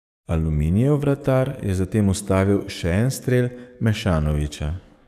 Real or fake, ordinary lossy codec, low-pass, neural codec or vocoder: fake; none; 14.4 kHz; codec, 44.1 kHz, 7.8 kbps, DAC